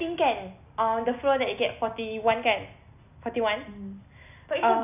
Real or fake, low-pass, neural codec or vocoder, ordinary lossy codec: real; 3.6 kHz; none; none